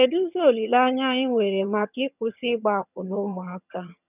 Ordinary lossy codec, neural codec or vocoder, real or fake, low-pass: none; vocoder, 22.05 kHz, 80 mel bands, HiFi-GAN; fake; 3.6 kHz